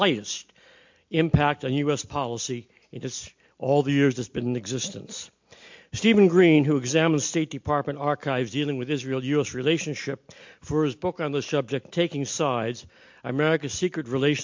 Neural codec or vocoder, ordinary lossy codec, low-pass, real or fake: none; MP3, 48 kbps; 7.2 kHz; real